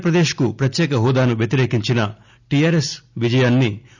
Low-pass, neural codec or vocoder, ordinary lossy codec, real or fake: 7.2 kHz; none; none; real